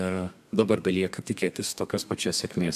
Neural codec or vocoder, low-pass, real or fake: codec, 32 kHz, 1.9 kbps, SNAC; 14.4 kHz; fake